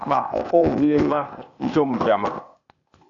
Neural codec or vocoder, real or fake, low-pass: codec, 16 kHz, 0.8 kbps, ZipCodec; fake; 7.2 kHz